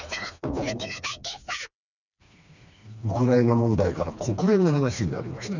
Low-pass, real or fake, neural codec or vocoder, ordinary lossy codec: 7.2 kHz; fake; codec, 16 kHz, 2 kbps, FreqCodec, smaller model; none